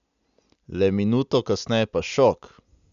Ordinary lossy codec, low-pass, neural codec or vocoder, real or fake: none; 7.2 kHz; none; real